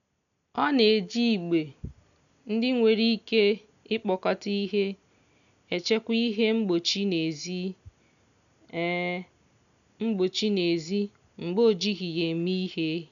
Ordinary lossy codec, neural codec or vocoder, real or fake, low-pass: none; none; real; 7.2 kHz